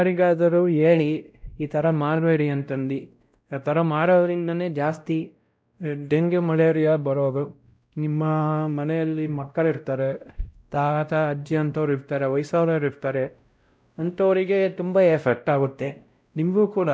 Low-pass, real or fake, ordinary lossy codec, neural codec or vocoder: none; fake; none; codec, 16 kHz, 0.5 kbps, X-Codec, WavLM features, trained on Multilingual LibriSpeech